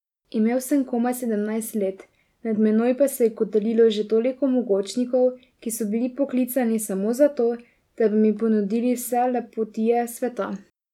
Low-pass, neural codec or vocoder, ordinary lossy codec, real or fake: 19.8 kHz; none; none; real